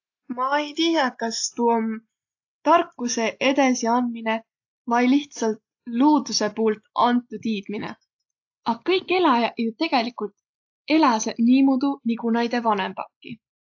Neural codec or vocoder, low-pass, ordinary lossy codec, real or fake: none; 7.2 kHz; AAC, 48 kbps; real